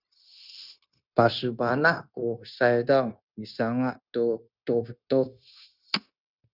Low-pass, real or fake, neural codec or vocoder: 5.4 kHz; fake; codec, 16 kHz, 0.9 kbps, LongCat-Audio-Codec